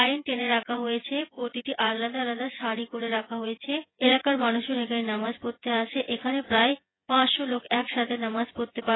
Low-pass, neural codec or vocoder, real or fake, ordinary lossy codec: 7.2 kHz; vocoder, 24 kHz, 100 mel bands, Vocos; fake; AAC, 16 kbps